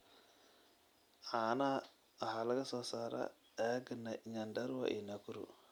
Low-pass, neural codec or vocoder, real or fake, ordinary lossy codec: none; none; real; none